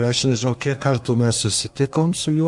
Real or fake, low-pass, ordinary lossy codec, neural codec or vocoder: fake; 10.8 kHz; MP3, 64 kbps; codec, 24 kHz, 1 kbps, SNAC